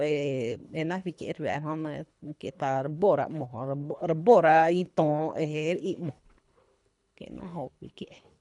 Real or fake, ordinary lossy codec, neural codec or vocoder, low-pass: fake; none; codec, 24 kHz, 3 kbps, HILCodec; 10.8 kHz